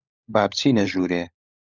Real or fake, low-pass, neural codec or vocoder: fake; 7.2 kHz; codec, 16 kHz, 16 kbps, FunCodec, trained on LibriTTS, 50 frames a second